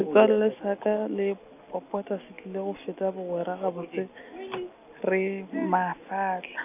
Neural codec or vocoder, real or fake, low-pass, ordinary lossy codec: none; real; 3.6 kHz; none